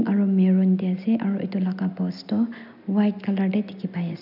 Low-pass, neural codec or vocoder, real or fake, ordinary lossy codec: 5.4 kHz; none; real; none